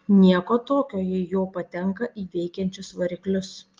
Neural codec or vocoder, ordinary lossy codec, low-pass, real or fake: none; Opus, 32 kbps; 7.2 kHz; real